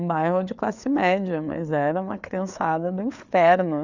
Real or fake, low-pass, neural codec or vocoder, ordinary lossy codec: fake; 7.2 kHz; codec, 16 kHz, 4 kbps, FreqCodec, larger model; none